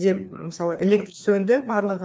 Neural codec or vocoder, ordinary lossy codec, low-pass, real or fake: codec, 16 kHz, 2 kbps, FreqCodec, larger model; none; none; fake